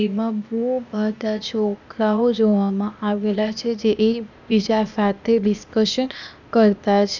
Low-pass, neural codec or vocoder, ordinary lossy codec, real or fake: 7.2 kHz; codec, 16 kHz, 0.8 kbps, ZipCodec; none; fake